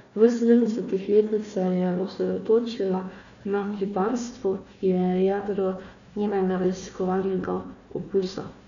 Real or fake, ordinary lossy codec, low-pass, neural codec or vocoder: fake; none; 7.2 kHz; codec, 16 kHz, 1 kbps, FunCodec, trained on Chinese and English, 50 frames a second